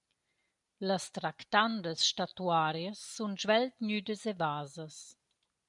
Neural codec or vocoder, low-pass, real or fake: none; 10.8 kHz; real